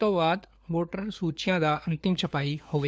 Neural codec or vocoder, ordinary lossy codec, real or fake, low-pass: codec, 16 kHz, 4 kbps, FunCodec, trained on LibriTTS, 50 frames a second; none; fake; none